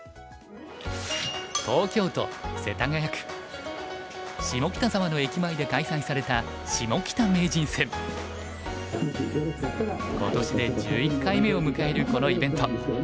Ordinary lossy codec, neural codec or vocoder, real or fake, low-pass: none; none; real; none